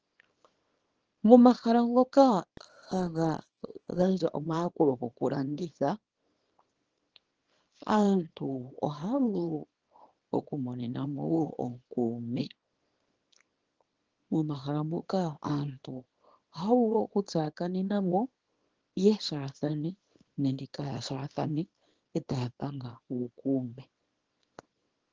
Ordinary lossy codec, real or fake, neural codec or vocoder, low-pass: Opus, 16 kbps; fake; codec, 24 kHz, 0.9 kbps, WavTokenizer, small release; 7.2 kHz